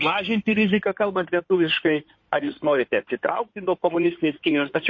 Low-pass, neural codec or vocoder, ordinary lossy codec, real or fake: 7.2 kHz; codec, 16 kHz in and 24 kHz out, 2.2 kbps, FireRedTTS-2 codec; MP3, 32 kbps; fake